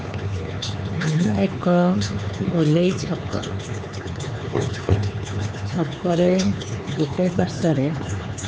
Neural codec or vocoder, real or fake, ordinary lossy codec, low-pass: codec, 16 kHz, 4 kbps, X-Codec, HuBERT features, trained on LibriSpeech; fake; none; none